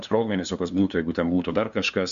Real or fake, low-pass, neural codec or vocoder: fake; 7.2 kHz; codec, 16 kHz, 2 kbps, FunCodec, trained on LibriTTS, 25 frames a second